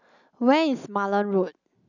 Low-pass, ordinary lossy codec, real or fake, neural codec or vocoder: 7.2 kHz; none; real; none